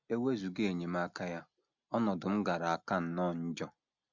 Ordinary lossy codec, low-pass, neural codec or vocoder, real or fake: none; 7.2 kHz; none; real